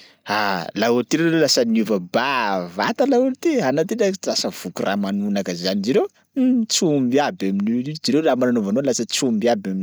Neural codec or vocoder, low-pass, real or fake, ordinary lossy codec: none; none; real; none